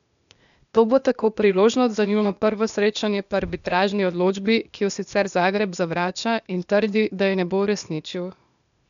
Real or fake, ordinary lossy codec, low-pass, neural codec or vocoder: fake; none; 7.2 kHz; codec, 16 kHz, 0.8 kbps, ZipCodec